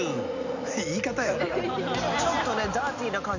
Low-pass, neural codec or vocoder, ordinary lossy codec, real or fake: 7.2 kHz; none; none; real